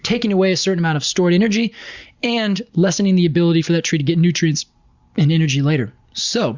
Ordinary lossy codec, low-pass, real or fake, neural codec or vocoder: Opus, 64 kbps; 7.2 kHz; real; none